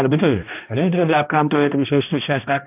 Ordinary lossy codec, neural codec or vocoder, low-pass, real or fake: AAC, 32 kbps; codec, 16 kHz, 1 kbps, X-Codec, HuBERT features, trained on balanced general audio; 3.6 kHz; fake